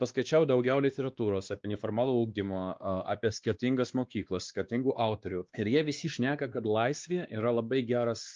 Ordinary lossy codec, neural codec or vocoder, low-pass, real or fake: Opus, 24 kbps; codec, 16 kHz, 2 kbps, X-Codec, WavLM features, trained on Multilingual LibriSpeech; 7.2 kHz; fake